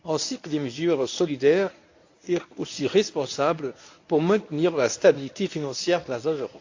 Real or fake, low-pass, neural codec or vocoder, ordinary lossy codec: fake; 7.2 kHz; codec, 24 kHz, 0.9 kbps, WavTokenizer, medium speech release version 1; AAC, 48 kbps